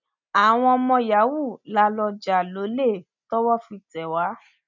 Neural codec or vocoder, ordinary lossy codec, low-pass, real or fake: none; none; 7.2 kHz; real